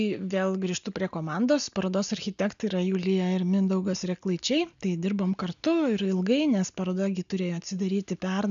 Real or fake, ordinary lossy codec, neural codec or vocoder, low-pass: real; MP3, 96 kbps; none; 7.2 kHz